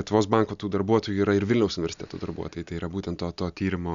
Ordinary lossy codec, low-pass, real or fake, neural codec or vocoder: Opus, 64 kbps; 7.2 kHz; real; none